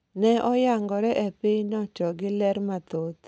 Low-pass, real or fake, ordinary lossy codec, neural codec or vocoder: none; real; none; none